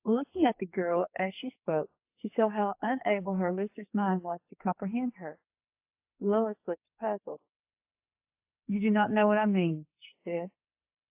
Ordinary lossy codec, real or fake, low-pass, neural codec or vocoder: AAC, 32 kbps; fake; 3.6 kHz; codec, 44.1 kHz, 2.6 kbps, SNAC